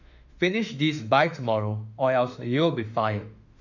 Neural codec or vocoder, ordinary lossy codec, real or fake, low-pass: autoencoder, 48 kHz, 32 numbers a frame, DAC-VAE, trained on Japanese speech; none; fake; 7.2 kHz